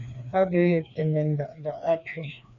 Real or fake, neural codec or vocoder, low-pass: fake; codec, 16 kHz, 2 kbps, FreqCodec, larger model; 7.2 kHz